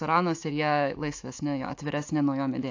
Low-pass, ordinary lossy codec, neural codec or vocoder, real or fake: 7.2 kHz; AAC, 48 kbps; autoencoder, 48 kHz, 128 numbers a frame, DAC-VAE, trained on Japanese speech; fake